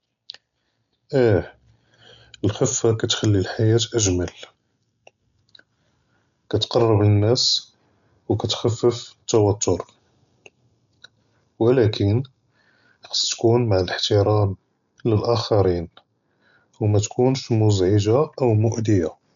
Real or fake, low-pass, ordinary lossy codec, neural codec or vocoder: real; 7.2 kHz; none; none